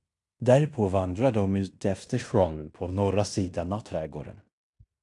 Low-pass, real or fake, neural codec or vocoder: 10.8 kHz; fake; codec, 16 kHz in and 24 kHz out, 0.9 kbps, LongCat-Audio-Codec, fine tuned four codebook decoder